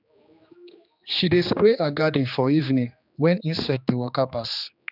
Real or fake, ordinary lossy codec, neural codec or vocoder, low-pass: fake; none; codec, 16 kHz, 2 kbps, X-Codec, HuBERT features, trained on general audio; 5.4 kHz